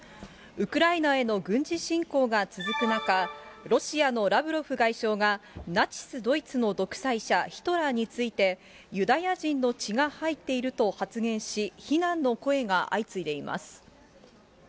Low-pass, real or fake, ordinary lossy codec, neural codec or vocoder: none; real; none; none